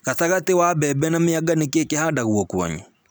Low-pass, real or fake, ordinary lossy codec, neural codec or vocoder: none; real; none; none